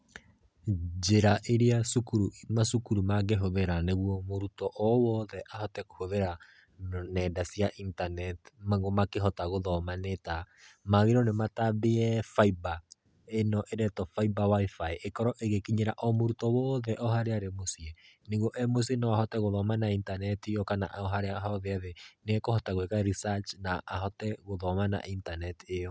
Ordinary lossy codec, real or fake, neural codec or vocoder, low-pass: none; real; none; none